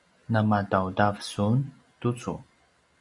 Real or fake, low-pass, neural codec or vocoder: real; 10.8 kHz; none